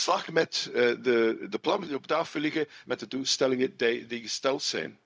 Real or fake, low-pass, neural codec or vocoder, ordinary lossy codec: fake; none; codec, 16 kHz, 0.4 kbps, LongCat-Audio-Codec; none